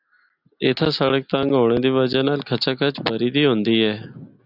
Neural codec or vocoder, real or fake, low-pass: none; real; 5.4 kHz